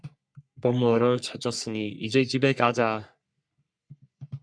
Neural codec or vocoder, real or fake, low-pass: codec, 44.1 kHz, 3.4 kbps, Pupu-Codec; fake; 9.9 kHz